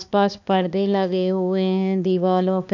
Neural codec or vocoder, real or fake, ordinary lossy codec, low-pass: codec, 16 kHz, 2 kbps, X-Codec, HuBERT features, trained on balanced general audio; fake; none; 7.2 kHz